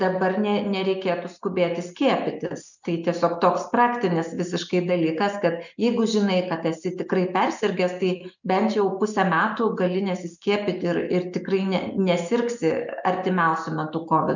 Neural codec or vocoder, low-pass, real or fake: none; 7.2 kHz; real